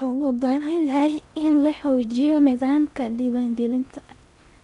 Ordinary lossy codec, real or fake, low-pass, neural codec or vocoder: none; fake; 10.8 kHz; codec, 16 kHz in and 24 kHz out, 0.6 kbps, FocalCodec, streaming, 4096 codes